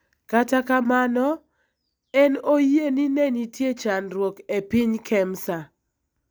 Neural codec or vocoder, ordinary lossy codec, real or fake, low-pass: none; none; real; none